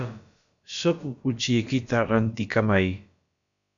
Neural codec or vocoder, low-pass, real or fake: codec, 16 kHz, about 1 kbps, DyCAST, with the encoder's durations; 7.2 kHz; fake